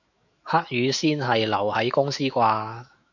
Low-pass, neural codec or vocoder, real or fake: 7.2 kHz; autoencoder, 48 kHz, 128 numbers a frame, DAC-VAE, trained on Japanese speech; fake